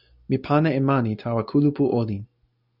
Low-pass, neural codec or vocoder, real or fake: 5.4 kHz; none; real